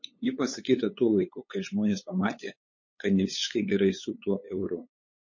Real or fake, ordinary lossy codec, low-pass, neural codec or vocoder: fake; MP3, 32 kbps; 7.2 kHz; codec, 16 kHz, 8 kbps, FunCodec, trained on LibriTTS, 25 frames a second